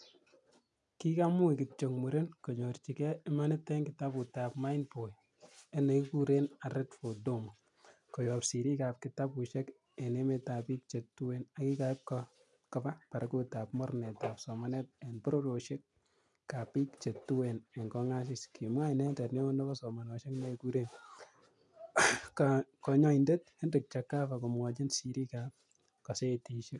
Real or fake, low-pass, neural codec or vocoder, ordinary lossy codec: real; 10.8 kHz; none; none